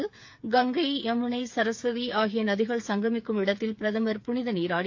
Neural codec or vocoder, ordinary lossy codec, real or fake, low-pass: codec, 16 kHz, 8 kbps, FreqCodec, smaller model; AAC, 48 kbps; fake; 7.2 kHz